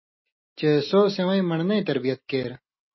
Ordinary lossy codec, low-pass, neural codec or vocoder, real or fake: MP3, 24 kbps; 7.2 kHz; none; real